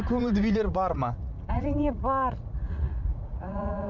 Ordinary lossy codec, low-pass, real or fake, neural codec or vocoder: none; 7.2 kHz; fake; vocoder, 44.1 kHz, 80 mel bands, Vocos